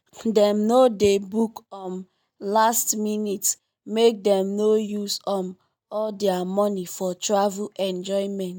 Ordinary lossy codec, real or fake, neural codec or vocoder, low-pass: none; real; none; none